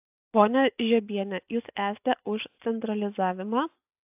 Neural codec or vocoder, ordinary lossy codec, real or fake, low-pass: none; AAC, 32 kbps; real; 3.6 kHz